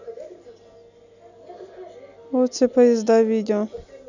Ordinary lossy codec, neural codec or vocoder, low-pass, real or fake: none; none; 7.2 kHz; real